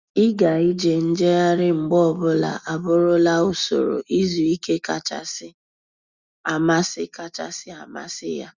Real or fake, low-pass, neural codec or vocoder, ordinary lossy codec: real; 7.2 kHz; none; Opus, 64 kbps